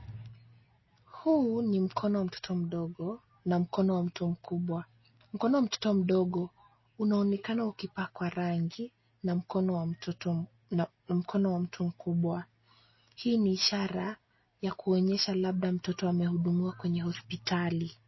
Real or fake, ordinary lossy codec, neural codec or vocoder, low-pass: real; MP3, 24 kbps; none; 7.2 kHz